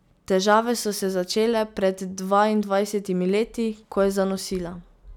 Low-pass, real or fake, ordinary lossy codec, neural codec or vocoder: 19.8 kHz; real; none; none